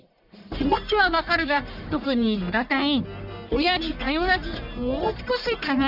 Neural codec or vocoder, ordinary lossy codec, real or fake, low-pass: codec, 44.1 kHz, 1.7 kbps, Pupu-Codec; none; fake; 5.4 kHz